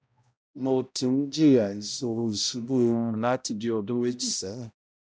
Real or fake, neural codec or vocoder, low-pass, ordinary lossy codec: fake; codec, 16 kHz, 0.5 kbps, X-Codec, HuBERT features, trained on balanced general audio; none; none